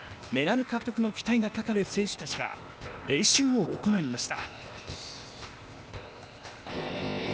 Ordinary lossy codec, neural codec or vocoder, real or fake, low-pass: none; codec, 16 kHz, 0.8 kbps, ZipCodec; fake; none